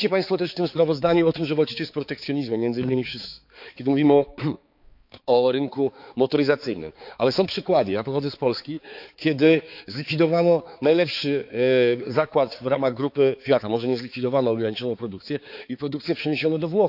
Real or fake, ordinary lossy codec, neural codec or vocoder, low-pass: fake; AAC, 48 kbps; codec, 16 kHz, 4 kbps, X-Codec, HuBERT features, trained on balanced general audio; 5.4 kHz